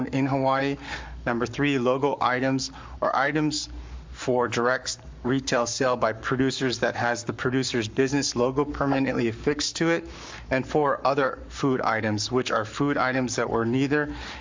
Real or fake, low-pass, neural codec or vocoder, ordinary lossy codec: fake; 7.2 kHz; codec, 44.1 kHz, 7.8 kbps, Pupu-Codec; MP3, 64 kbps